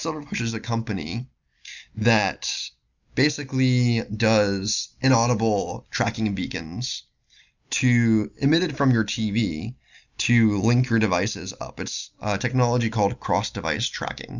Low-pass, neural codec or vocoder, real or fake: 7.2 kHz; none; real